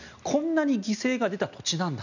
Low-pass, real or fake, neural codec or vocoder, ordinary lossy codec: 7.2 kHz; real; none; none